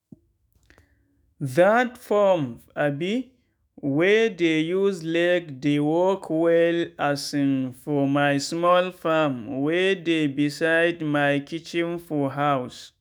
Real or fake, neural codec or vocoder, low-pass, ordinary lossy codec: fake; autoencoder, 48 kHz, 128 numbers a frame, DAC-VAE, trained on Japanese speech; none; none